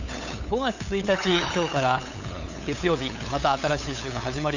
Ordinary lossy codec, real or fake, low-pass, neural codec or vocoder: none; fake; 7.2 kHz; codec, 16 kHz, 8 kbps, FunCodec, trained on LibriTTS, 25 frames a second